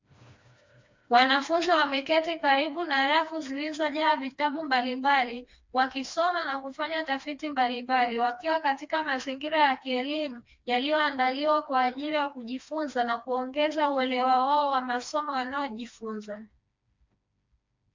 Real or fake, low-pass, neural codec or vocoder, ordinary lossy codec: fake; 7.2 kHz; codec, 16 kHz, 2 kbps, FreqCodec, smaller model; MP3, 48 kbps